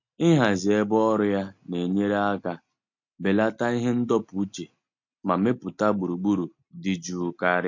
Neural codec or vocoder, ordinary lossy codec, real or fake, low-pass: none; MP3, 48 kbps; real; 7.2 kHz